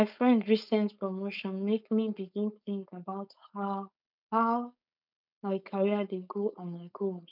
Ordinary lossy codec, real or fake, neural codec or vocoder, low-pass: none; fake; codec, 16 kHz, 4.8 kbps, FACodec; 5.4 kHz